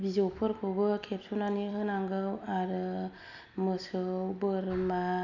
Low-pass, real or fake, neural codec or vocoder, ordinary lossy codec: 7.2 kHz; real; none; none